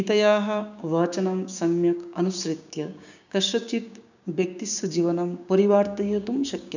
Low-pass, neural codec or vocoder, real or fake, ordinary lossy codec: 7.2 kHz; codec, 16 kHz, 6 kbps, DAC; fake; none